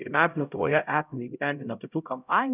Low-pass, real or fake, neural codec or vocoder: 3.6 kHz; fake; codec, 16 kHz, 0.5 kbps, X-Codec, HuBERT features, trained on LibriSpeech